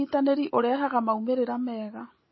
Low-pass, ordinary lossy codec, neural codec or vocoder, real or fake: 7.2 kHz; MP3, 24 kbps; none; real